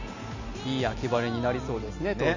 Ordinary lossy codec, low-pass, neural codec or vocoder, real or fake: none; 7.2 kHz; none; real